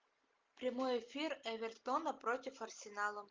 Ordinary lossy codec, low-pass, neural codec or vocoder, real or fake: Opus, 24 kbps; 7.2 kHz; none; real